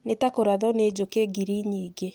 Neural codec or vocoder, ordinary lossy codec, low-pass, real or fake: none; Opus, 24 kbps; 19.8 kHz; real